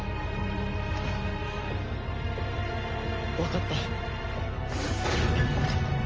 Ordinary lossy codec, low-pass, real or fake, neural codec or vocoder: Opus, 24 kbps; 7.2 kHz; real; none